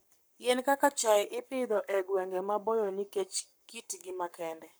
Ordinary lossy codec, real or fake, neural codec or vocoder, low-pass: none; fake; codec, 44.1 kHz, 7.8 kbps, Pupu-Codec; none